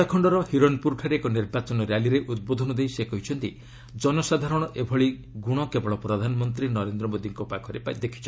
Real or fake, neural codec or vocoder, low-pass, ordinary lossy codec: real; none; none; none